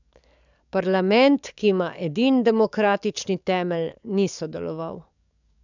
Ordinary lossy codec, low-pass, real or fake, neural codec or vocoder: none; 7.2 kHz; fake; codec, 44.1 kHz, 7.8 kbps, DAC